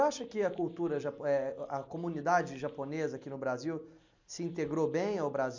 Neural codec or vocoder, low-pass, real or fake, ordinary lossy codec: none; 7.2 kHz; real; MP3, 64 kbps